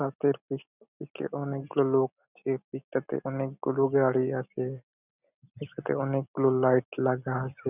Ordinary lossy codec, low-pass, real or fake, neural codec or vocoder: none; 3.6 kHz; real; none